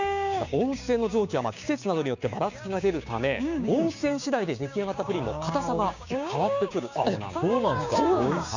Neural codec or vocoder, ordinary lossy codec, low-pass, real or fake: codec, 16 kHz, 6 kbps, DAC; none; 7.2 kHz; fake